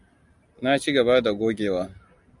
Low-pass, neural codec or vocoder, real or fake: 10.8 kHz; none; real